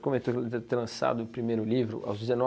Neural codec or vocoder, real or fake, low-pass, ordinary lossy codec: none; real; none; none